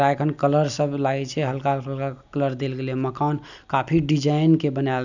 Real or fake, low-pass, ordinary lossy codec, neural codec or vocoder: real; 7.2 kHz; none; none